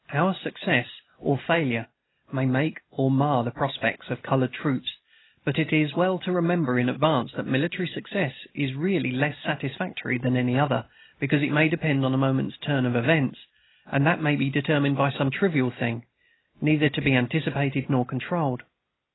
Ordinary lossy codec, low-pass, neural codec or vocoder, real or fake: AAC, 16 kbps; 7.2 kHz; none; real